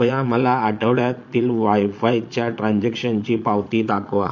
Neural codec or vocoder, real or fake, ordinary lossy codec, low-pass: vocoder, 22.05 kHz, 80 mel bands, Vocos; fake; MP3, 48 kbps; 7.2 kHz